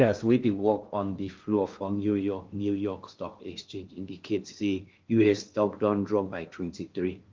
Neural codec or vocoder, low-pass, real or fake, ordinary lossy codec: codec, 16 kHz in and 24 kHz out, 0.6 kbps, FocalCodec, streaming, 2048 codes; 7.2 kHz; fake; Opus, 24 kbps